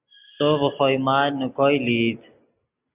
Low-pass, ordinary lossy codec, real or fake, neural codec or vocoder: 3.6 kHz; Opus, 64 kbps; real; none